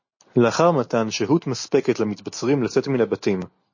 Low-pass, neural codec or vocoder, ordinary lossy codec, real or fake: 7.2 kHz; none; MP3, 32 kbps; real